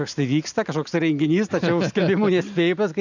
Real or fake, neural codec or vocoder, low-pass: real; none; 7.2 kHz